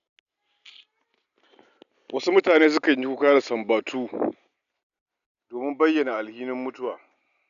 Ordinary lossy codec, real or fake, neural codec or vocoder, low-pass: none; real; none; 7.2 kHz